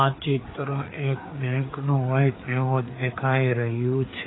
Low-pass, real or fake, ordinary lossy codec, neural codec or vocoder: 7.2 kHz; fake; AAC, 16 kbps; codec, 16 kHz, 4 kbps, FreqCodec, larger model